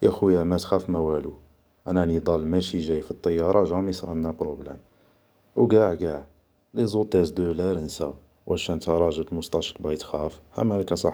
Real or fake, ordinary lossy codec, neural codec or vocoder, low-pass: fake; none; codec, 44.1 kHz, 7.8 kbps, DAC; none